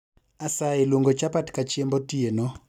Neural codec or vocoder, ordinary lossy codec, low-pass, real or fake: none; none; 19.8 kHz; real